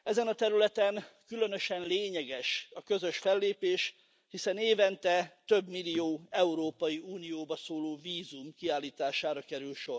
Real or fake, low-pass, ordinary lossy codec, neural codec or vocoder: real; none; none; none